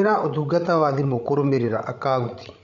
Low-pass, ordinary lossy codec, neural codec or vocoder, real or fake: 7.2 kHz; MP3, 48 kbps; codec, 16 kHz, 16 kbps, FreqCodec, larger model; fake